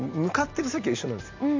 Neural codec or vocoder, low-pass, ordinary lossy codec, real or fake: none; 7.2 kHz; MP3, 48 kbps; real